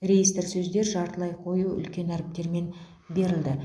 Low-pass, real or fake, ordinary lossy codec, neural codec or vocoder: none; real; none; none